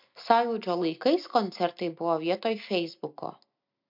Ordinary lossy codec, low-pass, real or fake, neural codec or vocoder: MP3, 48 kbps; 5.4 kHz; real; none